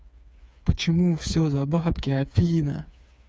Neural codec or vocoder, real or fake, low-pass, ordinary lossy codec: codec, 16 kHz, 4 kbps, FreqCodec, smaller model; fake; none; none